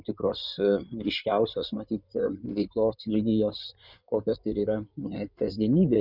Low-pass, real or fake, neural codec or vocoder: 5.4 kHz; fake; codec, 16 kHz in and 24 kHz out, 2.2 kbps, FireRedTTS-2 codec